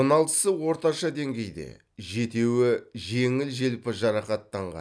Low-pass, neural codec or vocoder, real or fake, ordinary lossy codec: none; none; real; none